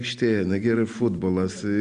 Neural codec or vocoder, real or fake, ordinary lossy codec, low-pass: none; real; Opus, 64 kbps; 9.9 kHz